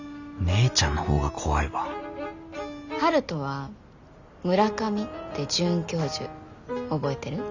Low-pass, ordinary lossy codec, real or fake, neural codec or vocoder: 7.2 kHz; Opus, 64 kbps; real; none